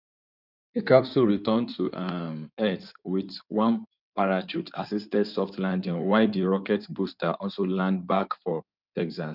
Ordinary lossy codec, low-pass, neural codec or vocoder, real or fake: none; 5.4 kHz; codec, 16 kHz in and 24 kHz out, 2.2 kbps, FireRedTTS-2 codec; fake